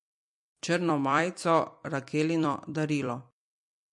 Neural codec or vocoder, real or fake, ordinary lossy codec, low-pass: vocoder, 44.1 kHz, 128 mel bands every 256 samples, BigVGAN v2; fake; MP3, 48 kbps; 10.8 kHz